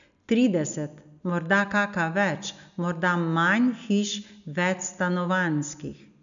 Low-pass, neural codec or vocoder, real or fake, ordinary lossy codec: 7.2 kHz; none; real; none